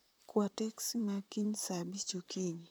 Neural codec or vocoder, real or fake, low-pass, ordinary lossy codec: vocoder, 44.1 kHz, 128 mel bands, Pupu-Vocoder; fake; none; none